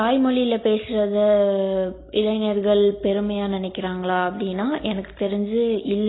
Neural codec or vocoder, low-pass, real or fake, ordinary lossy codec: none; 7.2 kHz; real; AAC, 16 kbps